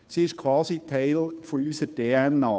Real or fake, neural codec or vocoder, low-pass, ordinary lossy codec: fake; codec, 16 kHz, 2 kbps, FunCodec, trained on Chinese and English, 25 frames a second; none; none